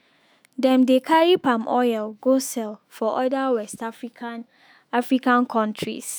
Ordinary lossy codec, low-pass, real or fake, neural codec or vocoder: none; none; fake; autoencoder, 48 kHz, 128 numbers a frame, DAC-VAE, trained on Japanese speech